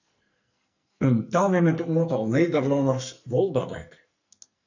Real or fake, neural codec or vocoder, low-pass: fake; codec, 44.1 kHz, 2.6 kbps, SNAC; 7.2 kHz